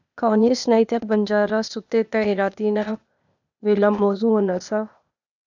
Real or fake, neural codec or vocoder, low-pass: fake; codec, 16 kHz, 0.8 kbps, ZipCodec; 7.2 kHz